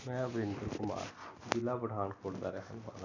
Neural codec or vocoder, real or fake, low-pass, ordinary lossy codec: none; real; 7.2 kHz; none